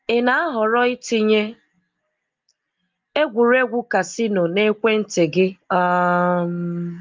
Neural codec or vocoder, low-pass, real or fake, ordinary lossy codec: none; 7.2 kHz; real; Opus, 32 kbps